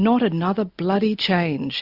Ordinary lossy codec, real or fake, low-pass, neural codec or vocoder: Opus, 64 kbps; real; 5.4 kHz; none